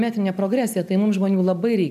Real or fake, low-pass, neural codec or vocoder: real; 14.4 kHz; none